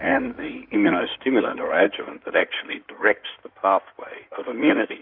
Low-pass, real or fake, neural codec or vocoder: 5.4 kHz; fake; codec, 16 kHz in and 24 kHz out, 2.2 kbps, FireRedTTS-2 codec